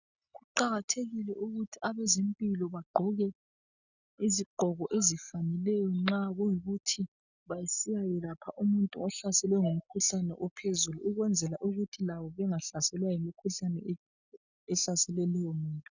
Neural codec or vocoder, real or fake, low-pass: none; real; 7.2 kHz